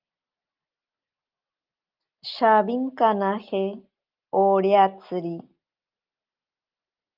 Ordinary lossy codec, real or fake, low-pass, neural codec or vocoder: Opus, 32 kbps; real; 5.4 kHz; none